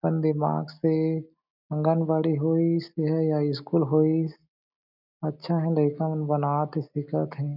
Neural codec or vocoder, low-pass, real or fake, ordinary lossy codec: none; 5.4 kHz; real; none